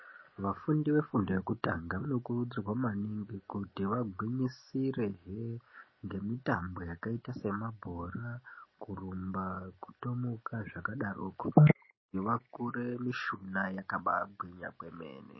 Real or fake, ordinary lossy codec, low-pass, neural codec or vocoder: real; MP3, 24 kbps; 7.2 kHz; none